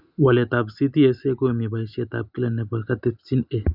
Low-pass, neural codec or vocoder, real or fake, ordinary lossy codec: 5.4 kHz; none; real; none